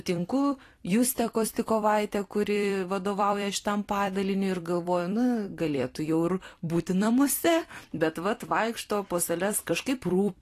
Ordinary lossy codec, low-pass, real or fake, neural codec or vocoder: AAC, 48 kbps; 14.4 kHz; fake; vocoder, 44.1 kHz, 128 mel bands every 256 samples, BigVGAN v2